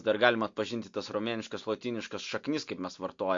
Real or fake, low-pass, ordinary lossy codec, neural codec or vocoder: real; 7.2 kHz; MP3, 48 kbps; none